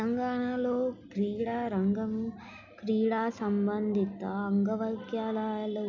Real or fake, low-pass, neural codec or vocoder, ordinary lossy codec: real; 7.2 kHz; none; MP3, 64 kbps